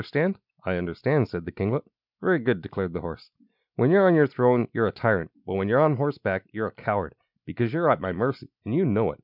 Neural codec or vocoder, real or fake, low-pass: none; real; 5.4 kHz